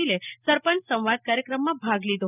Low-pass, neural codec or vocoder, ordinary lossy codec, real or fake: 3.6 kHz; none; none; real